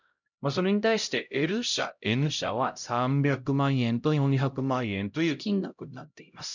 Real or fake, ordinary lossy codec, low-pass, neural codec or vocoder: fake; none; 7.2 kHz; codec, 16 kHz, 0.5 kbps, X-Codec, HuBERT features, trained on LibriSpeech